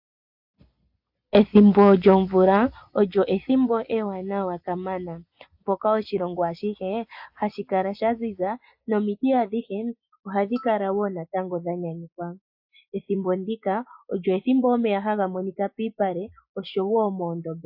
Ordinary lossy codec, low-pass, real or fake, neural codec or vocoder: AAC, 48 kbps; 5.4 kHz; real; none